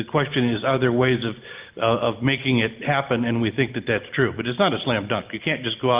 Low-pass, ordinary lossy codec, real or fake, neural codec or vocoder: 3.6 kHz; Opus, 32 kbps; real; none